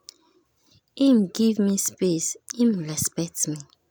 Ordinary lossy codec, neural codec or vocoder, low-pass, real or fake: none; none; none; real